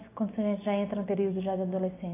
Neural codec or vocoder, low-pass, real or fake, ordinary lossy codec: none; 3.6 kHz; real; AAC, 16 kbps